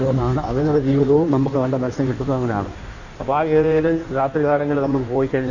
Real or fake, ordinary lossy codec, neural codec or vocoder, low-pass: fake; Opus, 64 kbps; codec, 16 kHz in and 24 kHz out, 1.1 kbps, FireRedTTS-2 codec; 7.2 kHz